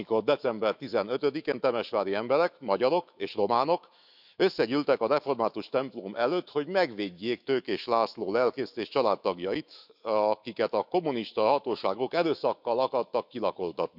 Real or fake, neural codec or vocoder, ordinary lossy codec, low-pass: fake; autoencoder, 48 kHz, 128 numbers a frame, DAC-VAE, trained on Japanese speech; none; 5.4 kHz